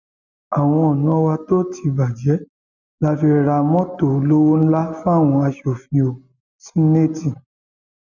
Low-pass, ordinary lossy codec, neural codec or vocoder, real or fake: 7.2 kHz; none; none; real